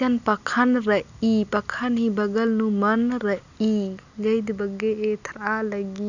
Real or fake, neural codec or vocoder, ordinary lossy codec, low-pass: real; none; none; 7.2 kHz